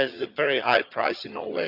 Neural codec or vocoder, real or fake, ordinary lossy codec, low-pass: vocoder, 22.05 kHz, 80 mel bands, HiFi-GAN; fake; none; 5.4 kHz